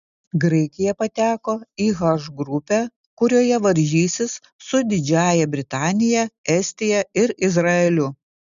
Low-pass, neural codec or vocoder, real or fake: 7.2 kHz; none; real